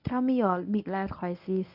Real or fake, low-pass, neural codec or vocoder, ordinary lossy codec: fake; 5.4 kHz; codec, 24 kHz, 0.9 kbps, WavTokenizer, medium speech release version 1; none